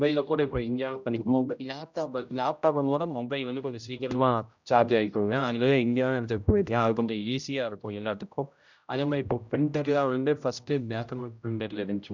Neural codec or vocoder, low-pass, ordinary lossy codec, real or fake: codec, 16 kHz, 0.5 kbps, X-Codec, HuBERT features, trained on general audio; 7.2 kHz; none; fake